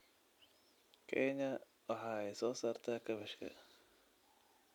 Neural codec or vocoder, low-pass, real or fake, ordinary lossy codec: none; 19.8 kHz; real; none